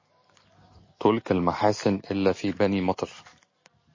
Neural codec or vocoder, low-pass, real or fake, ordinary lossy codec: none; 7.2 kHz; real; MP3, 32 kbps